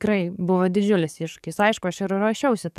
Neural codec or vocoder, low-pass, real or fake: codec, 44.1 kHz, 7.8 kbps, DAC; 14.4 kHz; fake